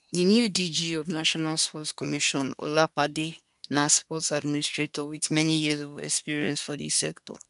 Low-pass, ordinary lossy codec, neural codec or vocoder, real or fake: 10.8 kHz; none; codec, 24 kHz, 1 kbps, SNAC; fake